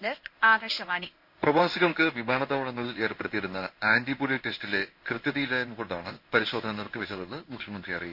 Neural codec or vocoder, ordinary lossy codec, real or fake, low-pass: codec, 16 kHz in and 24 kHz out, 1 kbps, XY-Tokenizer; none; fake; 5.4 kHz